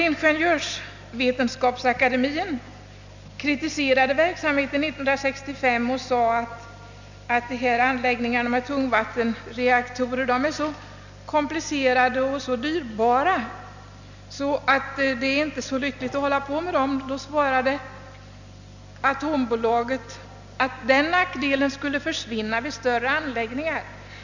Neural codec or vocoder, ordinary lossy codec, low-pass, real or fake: none; none; 7.2 kHz; real